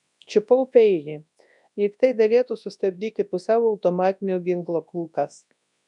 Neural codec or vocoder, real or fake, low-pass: codec, 24 kHz, 0.9 kbps, WavTokenizer, large speech release; fake; 10.8 kHz